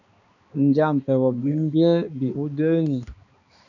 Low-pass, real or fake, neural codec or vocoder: 7.2 kHz; fake; codec, 16 kHz, 2 kbps, X-Codec, HuBERT features, trained on balanced general audio